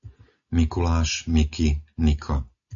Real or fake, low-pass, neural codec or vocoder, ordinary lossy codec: real; 7.2 kHz; none; MP3, 96 kbps